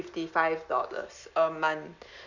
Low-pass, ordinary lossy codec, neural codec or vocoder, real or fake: 7.2 kHz; none; none; real